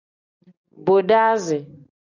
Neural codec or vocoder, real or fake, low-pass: none; real; 7.2 kHz